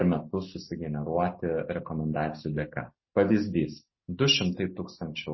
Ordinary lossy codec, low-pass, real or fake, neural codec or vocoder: MP3, 24 kbps; 7.2 kHz; real; none